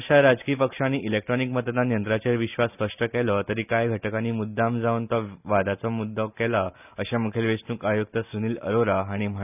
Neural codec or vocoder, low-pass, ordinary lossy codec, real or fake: none; 3.6 kHz; none; real